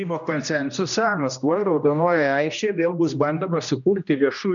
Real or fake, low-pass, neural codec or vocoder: fake; 7.2 kHz; codec, 16 kHz, 1 kbps, X-Codec, HuBERT features, trained on general audio